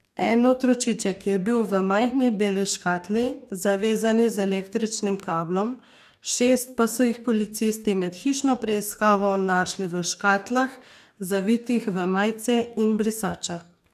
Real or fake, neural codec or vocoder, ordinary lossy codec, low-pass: fake; codec, 44.1 kHz, 2.6 kbps, DAC; none; 14.4 kHz